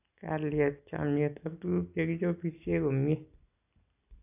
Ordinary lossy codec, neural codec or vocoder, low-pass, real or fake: AAC, 32 kbps; none; 3.6 kHz; real